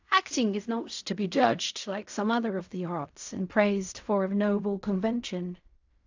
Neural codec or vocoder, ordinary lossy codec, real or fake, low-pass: codec, 16 kHz in and 24 kHz out, 0.4 kbps, LongCat-Audio-Codec, fine tuned four codebook decoder; AAC, 48 kbps; fake; 7.2 kHz